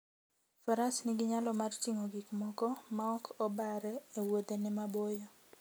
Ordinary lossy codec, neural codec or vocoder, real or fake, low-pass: none; none; real; none